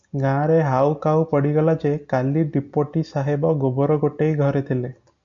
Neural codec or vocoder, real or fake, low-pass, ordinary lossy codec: none; real; 7.2 kHz; MP3, 64 kbps